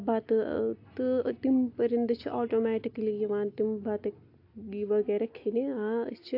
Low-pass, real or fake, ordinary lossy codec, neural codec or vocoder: 5.4 kHz; real; AAC, 32 kbps; none